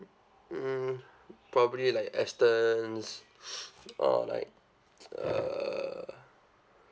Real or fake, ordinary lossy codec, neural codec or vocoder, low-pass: real; none; none; none